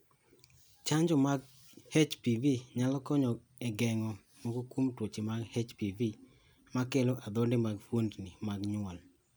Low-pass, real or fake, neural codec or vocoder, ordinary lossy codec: none; real; none; none